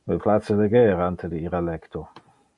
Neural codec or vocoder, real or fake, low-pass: none; real; 10.8 kHz